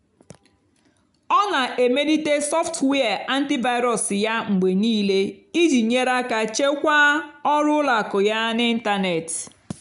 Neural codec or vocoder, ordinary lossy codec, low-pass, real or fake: none; none; 10.8 kHz; real